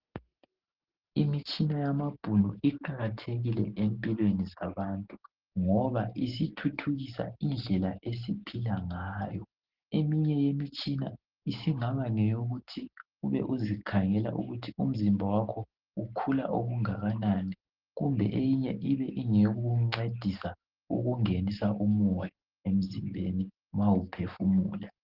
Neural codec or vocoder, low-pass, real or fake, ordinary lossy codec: none; 5.4 kHz; real; Opus, 16 kbps